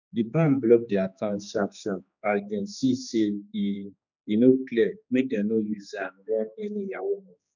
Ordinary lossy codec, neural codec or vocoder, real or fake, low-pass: none; codec, 16 kHz, 2 kbps, X-Codec, HuBERT features, trained on general audio; fake; 7.2 kHz